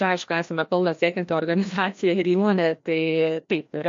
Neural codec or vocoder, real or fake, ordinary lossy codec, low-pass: codec, 16 kHz, 1 kbps, FreqCodec, larger model; fake; MP3, 48 kbps; 7.2 kHz